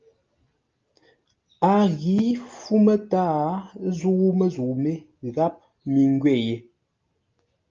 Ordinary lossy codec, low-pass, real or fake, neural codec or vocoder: Opus, 24 kbps; 7.2 kHz; real; none